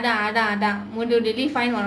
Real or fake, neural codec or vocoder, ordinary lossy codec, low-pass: real; none; none; none